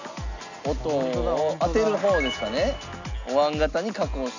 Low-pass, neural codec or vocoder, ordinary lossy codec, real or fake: 7.2 kHz; none; none; real